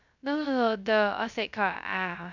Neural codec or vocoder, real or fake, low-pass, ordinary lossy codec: codec, 16 kHz, 0.2 kbps, FocalCodec; fake; 7.2 kHz; none